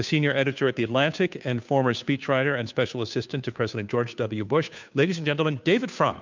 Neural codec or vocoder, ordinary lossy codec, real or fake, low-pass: codec, 16 kHz, 2 kbps, FunCodec, trained on Chinese and English, 25 frames a second; MP3, 64 kbps; fake; 7.2 kHz